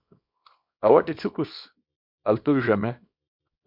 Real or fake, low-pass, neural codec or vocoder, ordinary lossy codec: fake; 5.4 kHz; codec, 24 kHz, 0.9 kbps, WavTokenizer, small release; MP3, 48 kbps